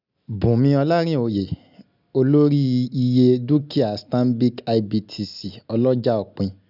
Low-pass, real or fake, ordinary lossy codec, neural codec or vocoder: 5.4 kHz; real; none; none